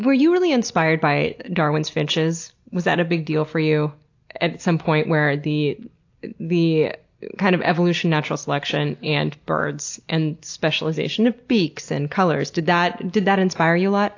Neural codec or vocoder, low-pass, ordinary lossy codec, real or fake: none; 7.2 kHz; AAC, 48 kbps; real